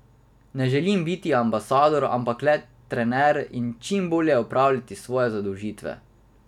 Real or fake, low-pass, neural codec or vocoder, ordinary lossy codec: real; 19.8 kHz; none; none